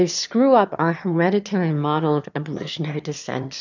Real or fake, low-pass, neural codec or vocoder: fake; 7.2 kHz; autoencoder, 22.05 kHz, a latent of 192 numbers a frame, VITS, trained on one speaker